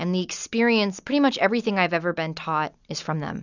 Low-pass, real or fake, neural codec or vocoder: 7.2 kHz; real; none